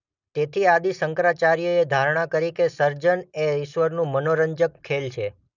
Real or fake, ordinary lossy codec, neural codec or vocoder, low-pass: real; none; none; 7.2 kHz